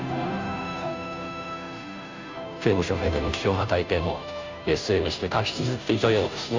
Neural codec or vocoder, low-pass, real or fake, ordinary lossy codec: codec, 16 kHz, 0.5 kbps, FunCodec, trained on Chinese and English, 25 frames a second; 7.2 kHz; fake; MP3, 64 kbps